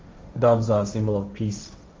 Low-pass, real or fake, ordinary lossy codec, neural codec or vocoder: 7.2 kHz; fake; Opus, 32 kbps; codec, 16 kHz, 1.1 kbps, Voila-Tokenizer